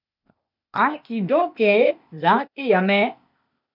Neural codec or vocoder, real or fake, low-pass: codec, 16 kHz, 0.8 kbps, ZipCodec; fake; 5.4 kHz